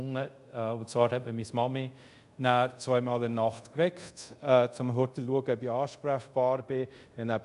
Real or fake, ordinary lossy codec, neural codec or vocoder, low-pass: fake; none; codec, 24 kHz, 0.5 kbps, DualCodec; 10.8 kHz